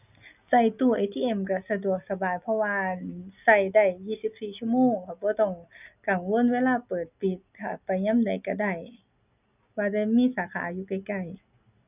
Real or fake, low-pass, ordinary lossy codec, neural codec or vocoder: real; 3.6 kHz; none; none